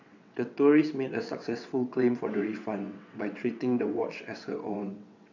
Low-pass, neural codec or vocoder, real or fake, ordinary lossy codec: 7.2 kHz; vocoder, 22.05 kHz, 80 mel bands, WaveNeXt; fake; none